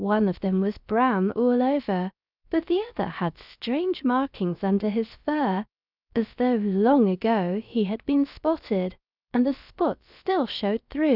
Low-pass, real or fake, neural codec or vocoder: 5.4 kHz; fake; codec, 16 kHz, about 1 kbps, DyCAST, with the encoder's durations